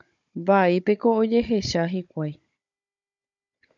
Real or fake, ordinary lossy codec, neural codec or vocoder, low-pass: fake; MP3, 96 kbps; codec, 16 kHz, 16 kbps, FunCodec, trained on Chinese and English, 50 frames a second; 7.2 kHz